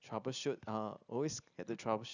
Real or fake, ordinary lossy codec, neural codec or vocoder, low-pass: real; none; none; 7.2 kHz